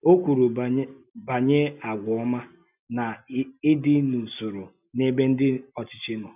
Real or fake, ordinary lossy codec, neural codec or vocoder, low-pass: real; none; none; 3.6 kHz